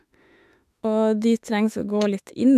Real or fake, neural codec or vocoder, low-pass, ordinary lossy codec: fake; autoencoder, 48 kHz, 32 numbers a frame, DAC-VAE, trained on Japanese speech; 14.4 kHz; AAC, 96 kbps